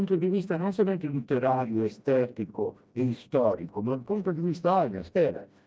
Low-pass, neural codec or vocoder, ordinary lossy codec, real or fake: none; codec, 16 kHz, 1 kbps, FreqCodec, smaller model; none; fake